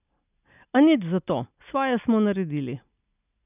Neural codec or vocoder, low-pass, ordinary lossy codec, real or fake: none; 3.6 kHz; none; real